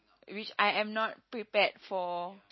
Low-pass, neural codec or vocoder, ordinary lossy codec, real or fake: 7.2 kHz; none; MP3, 24 kbps; real